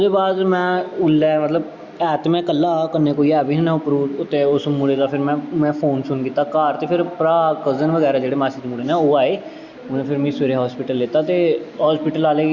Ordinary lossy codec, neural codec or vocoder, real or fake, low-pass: Opus, 64 kbps; none; real; 7.2 kHz